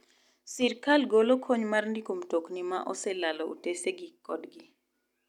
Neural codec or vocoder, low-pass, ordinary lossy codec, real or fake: none; 19.8 kHz; none; real